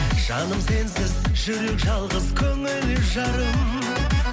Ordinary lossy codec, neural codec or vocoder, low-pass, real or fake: none; none; none; real